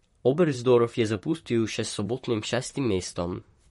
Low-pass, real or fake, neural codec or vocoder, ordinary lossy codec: 19.8 kHz; fake; vocoder, 44.1 kHz, 128 mel bands, Pupu-Vocoder; MP3, 48 kbps